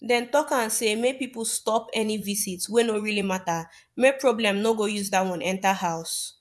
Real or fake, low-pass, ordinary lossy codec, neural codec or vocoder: fake; none; none; vocoder, 24 kHz, 100 mel bands, Vocos